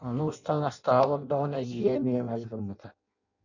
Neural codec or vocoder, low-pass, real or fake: codec, 16 kHz in and 24 kHz out, 0.6 kbps, FireRedTTS-2 codec; 7.2 kHz; fake